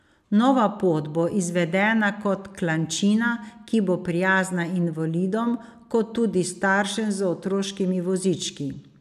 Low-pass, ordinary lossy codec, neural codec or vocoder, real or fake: 14.4 kHz; none; none; real